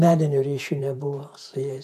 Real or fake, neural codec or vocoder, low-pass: fake; vocoder, 48 kHz, 128 mel bands, Vocos; 14.4 kHz